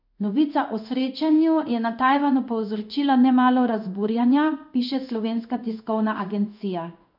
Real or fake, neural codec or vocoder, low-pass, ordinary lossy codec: fake; codec, 16 kHz in and 24 kHz out, 1 kbps, XY-Tokenizer; 5.4 kHz; none